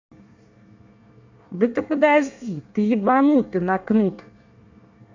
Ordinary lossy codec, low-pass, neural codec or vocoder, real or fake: AAC, 48 kbps; 7.2 kHz; codec, 24 kHz, 1 kbps, SNAC; fake